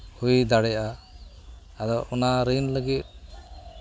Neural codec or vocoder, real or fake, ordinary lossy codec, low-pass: none; real; none; none